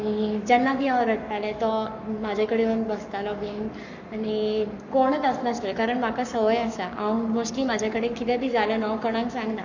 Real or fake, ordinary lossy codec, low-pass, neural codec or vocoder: fake; none; 7.2 kHz; codec, 44.1 kHz, 7.8 kbps, Pupu-Codec